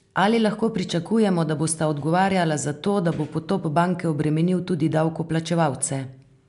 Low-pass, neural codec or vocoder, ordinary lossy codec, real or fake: 10.8 kHz; none; MP3, 96 kbps; real